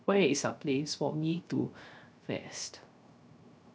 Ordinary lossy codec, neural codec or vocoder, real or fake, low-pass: none; codec, 16 kHz, 0.3 kbps, FocalCodec; fake; none